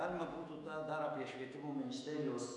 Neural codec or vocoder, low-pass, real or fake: autoencoder, 48 kHz, 128 numbers a frame, DAC-VAE, trained on Japanese speech; 10.8 kHz; fake